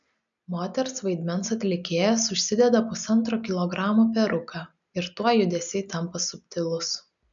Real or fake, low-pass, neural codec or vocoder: real; 7.2 kHz; none